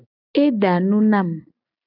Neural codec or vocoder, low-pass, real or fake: none; 5.4 kHz; real